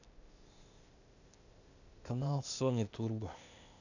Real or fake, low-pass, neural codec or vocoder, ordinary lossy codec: fake; 7.2 kHz; codec, 16 kHz, 0.8 kbps, ZipCodec; none